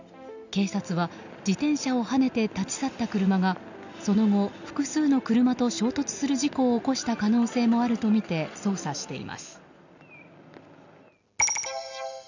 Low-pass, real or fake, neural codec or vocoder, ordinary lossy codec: 7.2 kHz; real; none; none